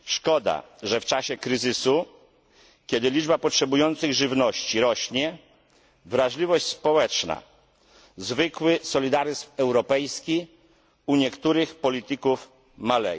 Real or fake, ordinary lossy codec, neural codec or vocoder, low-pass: real; none; none; none